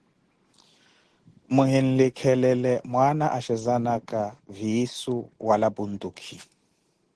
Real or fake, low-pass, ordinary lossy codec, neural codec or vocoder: real; 10.8 kHz; Opus, 16 kbps; none